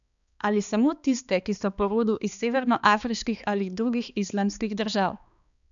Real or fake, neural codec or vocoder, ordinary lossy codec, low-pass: fake; codec, 16 kHz, 2 kbps, X-Codec, HuBERT features, trained on balanced general audio; MP3, 96 kbps; 7.2 kHz